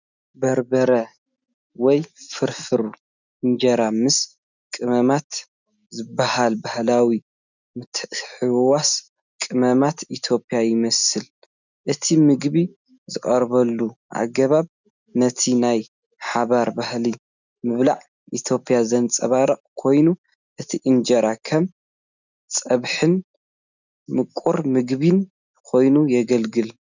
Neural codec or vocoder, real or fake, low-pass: none; real; 7.2 kHz